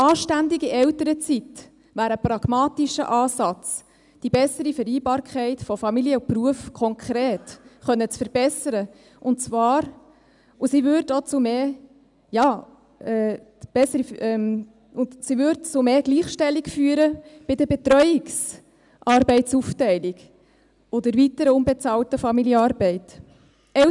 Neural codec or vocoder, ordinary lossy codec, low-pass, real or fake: none; none; 10.8 kHz; real